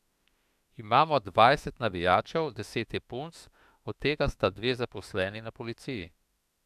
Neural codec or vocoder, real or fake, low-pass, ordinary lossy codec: autoencoder, 48 kHz, 32 numbers a frame, DAC-VAE, trained on Japanese speech; fake; 14.4 kHz; AAC, 96 kbps